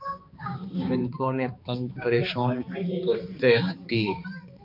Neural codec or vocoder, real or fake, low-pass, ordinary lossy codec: codec, 16 kHz, 2 kbps, X-Codec, HuBERT features, trained on balanced general audio; fake; 5.4 kHz; MP3, 48 kbps